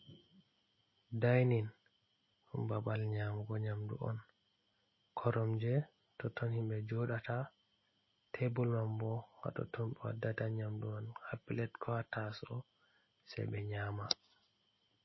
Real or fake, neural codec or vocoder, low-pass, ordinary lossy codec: real; none; 7.2 kHz; MP3, 24 kbps